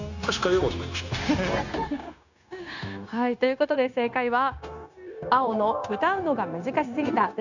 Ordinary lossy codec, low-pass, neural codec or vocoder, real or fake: none; 7.2 kHz; codec, 16 kHz, 0.9 kbps, LongCat-Audio-Codec; fake